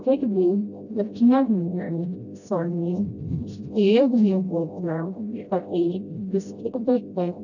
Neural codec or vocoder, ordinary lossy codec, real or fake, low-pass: codec, 16 kHz, 0.5 kbps, FreqCodec, smaller model; none; fake; 7.2 kHz